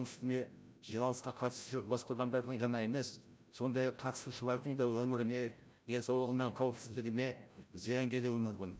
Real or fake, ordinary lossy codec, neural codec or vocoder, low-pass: fake; none; codec, 16 kHz, 0.5 kbps, FreqCodec, larger model; none